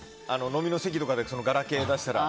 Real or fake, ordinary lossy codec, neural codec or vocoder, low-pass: real; none; none; none